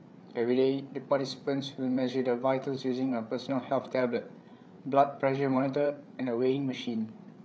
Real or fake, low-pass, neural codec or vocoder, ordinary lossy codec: fake; none; codec, 16 kHz, 8 kbps, FreqCodec, larger model; none